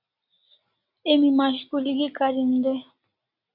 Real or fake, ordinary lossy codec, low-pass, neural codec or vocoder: real; AAC, 48 kbps; 5.4 kHz; none